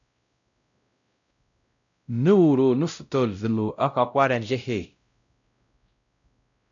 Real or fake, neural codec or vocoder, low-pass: fake; codec, 16 kHz, 0.5 kbps, X-Codec, WavLM features, trained on Multilingual LibriSpeech; 7.2 kHz